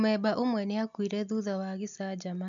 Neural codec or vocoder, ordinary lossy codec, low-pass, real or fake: none; none; 7.2 kHz; real